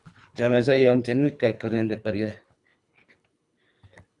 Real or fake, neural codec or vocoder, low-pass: fake; codec, 24 kHz, 3 kbps, HILCodec; 10.8 kHz